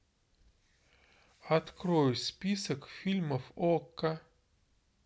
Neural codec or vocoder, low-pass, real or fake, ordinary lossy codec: none; none; real; none